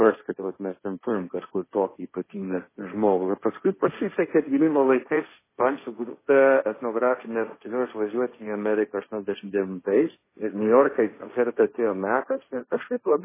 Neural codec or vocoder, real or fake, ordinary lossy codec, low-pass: codec, 16 kHz, 1.1 kbps, Voila-Tokenizer; fake; MP3, 16 kbps; 3.6 kHz